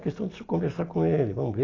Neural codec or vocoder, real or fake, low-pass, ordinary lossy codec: none; real; 7.2 kHz; AAC, 32 kbps